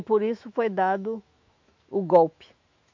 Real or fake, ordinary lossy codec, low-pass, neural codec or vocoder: fake; MP3, 48 kbps; 7.2 kHz; autoencoder, 48 kHz, 128 numbers a frame, DAC-VAE, trained on Japanese speech